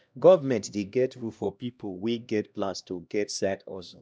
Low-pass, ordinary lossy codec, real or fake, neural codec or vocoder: none; none; fake; codec, 16 kHz, 1 kbps, X-Codec, HuBERT features, trained on LibriSpeech